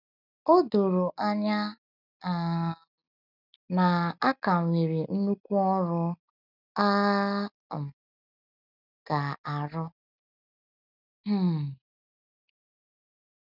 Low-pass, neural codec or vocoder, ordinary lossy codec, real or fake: 5.4 kHz; none; none; real